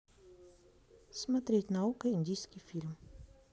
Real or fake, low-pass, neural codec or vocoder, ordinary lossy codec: real; none; none; none